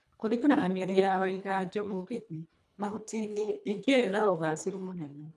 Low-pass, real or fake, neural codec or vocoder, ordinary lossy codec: none; fake; codec, 24 kHz, 1.5 kbps, HILCodec; none